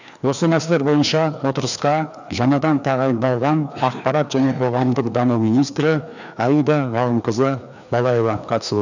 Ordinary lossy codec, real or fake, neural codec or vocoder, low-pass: none; fake; codec, 16 kHz, 2 kbps, FreqCodec, larger model; 7.2 kHz